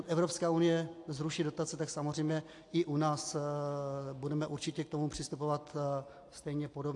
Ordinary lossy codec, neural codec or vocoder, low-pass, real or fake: AAC, 48 kbps; none; 10.8 kHz; real